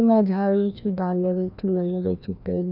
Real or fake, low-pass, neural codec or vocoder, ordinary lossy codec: fake; 5.4 kHz; codec, 16 kHz, 1 kbps, FreqCodec, larger model; none